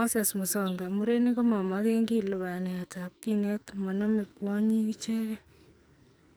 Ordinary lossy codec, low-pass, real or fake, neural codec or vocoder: none; none; fake; codec, 44.1 kHz, 2.6 kbps, SNAC